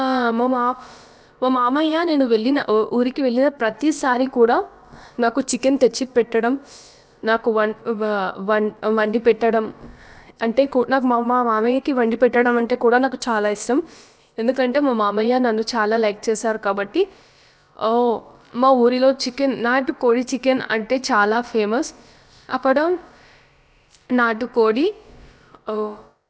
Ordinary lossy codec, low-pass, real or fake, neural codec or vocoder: none; none; fake; codec, 16 kHz, about 1 kbps, DyCAST, with the encoder's durations